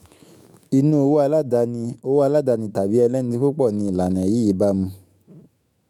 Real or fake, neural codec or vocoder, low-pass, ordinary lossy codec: fake; autoencoder, 48 kHz, 128 numbers a frame, DAC-VAE, trained on Japanese speech; 19.8 kHz; MP3, 96 kbps